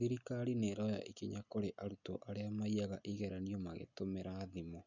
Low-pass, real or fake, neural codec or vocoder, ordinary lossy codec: 7.2 kHz; real; none; none